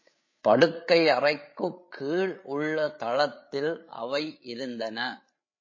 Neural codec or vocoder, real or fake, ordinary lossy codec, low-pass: codec, 16 kHz, 8 kbps, FreqCodec, larger model; fake; MP3, 32 kbps; 7.2 kHz